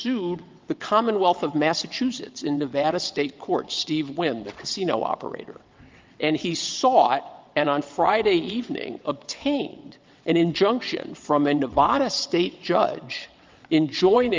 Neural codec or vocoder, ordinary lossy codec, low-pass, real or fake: vocoder, 22.05 kHz, 80 mel bands, WaveNeXt; Opus, 32 kbps; 7.2 kHz; fake